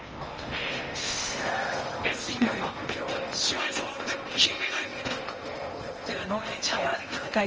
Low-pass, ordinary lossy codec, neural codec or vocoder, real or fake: 7.2 kHz; Opus, 24 kbps; codec, 16 kHz in and 24 kHz out, 0.8 kbps, FocalCodec, streaming, 65536 codes; fake